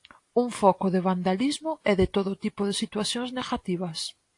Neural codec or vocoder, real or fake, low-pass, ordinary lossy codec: none; real; 10.8 kHz; AAC, 48 kbps